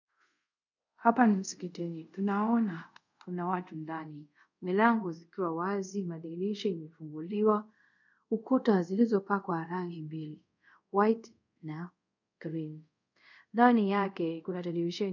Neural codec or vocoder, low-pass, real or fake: codec, 24 kHz, 0.5 kbps, DualCodec; 7.2 kHz; fake